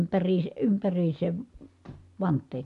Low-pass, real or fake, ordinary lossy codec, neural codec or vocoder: 10.8 kHz; real; AAC, 64 kbps; none